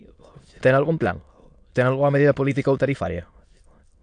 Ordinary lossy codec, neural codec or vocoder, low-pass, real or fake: AAC, 64 kbps; autoencoder, 22.05 kHz, a latent of 192 numbers a frame, VITS, trained on many speakers; 9.9 kHz; fake